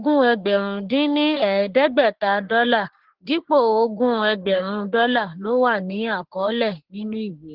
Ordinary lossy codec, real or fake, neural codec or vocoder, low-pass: Opus, 16 kbps; fake; codec, 44.1 kHz, 3.4 kbps, Pupu-Codec; 5.4 kHz